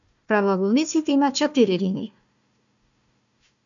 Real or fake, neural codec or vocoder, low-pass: fake; codec, 16 kHz, 1 kbps, FunCodec, trained on Chinese and English, 50 frames a second; 7.2 kHz